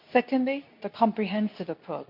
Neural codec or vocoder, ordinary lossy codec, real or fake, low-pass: codec, 24 kHz, 0.9 kbps, WavTokenizer, medium speech release version 2; none; fake; 5.4 kHz